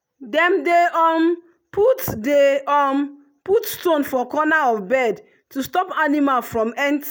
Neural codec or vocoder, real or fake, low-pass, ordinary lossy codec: none; real; none; none